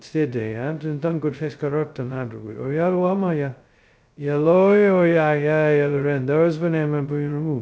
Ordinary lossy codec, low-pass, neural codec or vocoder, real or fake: none; none; codec, 16 kHz, 0.2 kbps, FocalCodec; fake